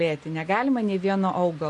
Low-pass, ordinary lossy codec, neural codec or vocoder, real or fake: 10.8 kHz; MP3, 48 kbps; none; real